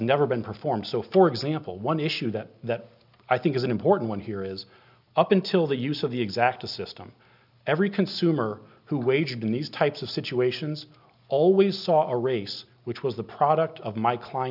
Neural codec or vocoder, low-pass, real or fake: none; 5.4 kHz; real